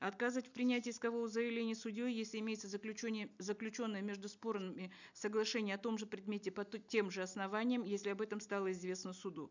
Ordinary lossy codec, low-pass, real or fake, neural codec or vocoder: none; 7.2 kHz; real; none